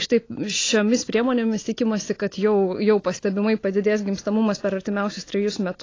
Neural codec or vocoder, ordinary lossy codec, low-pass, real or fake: none; AAC, 32 kbps; 7.2 kHz; real